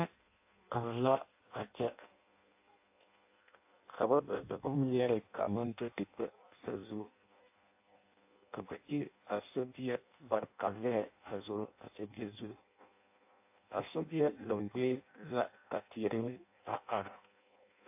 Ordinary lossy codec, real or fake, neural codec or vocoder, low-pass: AAC, 32 kbps; fake; codec, 16 kHz in and 24 kHz out, 0.6 kbps, FireRedTTS-2 codec; 3.6 kHz